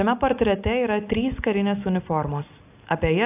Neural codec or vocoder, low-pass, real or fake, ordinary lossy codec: none; 3.6 kHz; real; AAC, 32 kbps